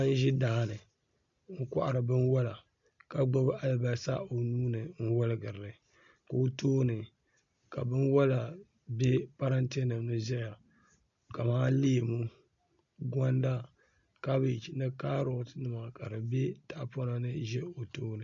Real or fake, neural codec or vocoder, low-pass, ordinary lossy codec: real; none; 7.2 kHz; MP3, 64 kbps